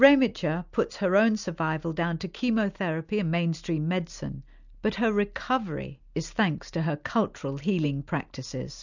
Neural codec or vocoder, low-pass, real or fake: none; 7.2 kHz; real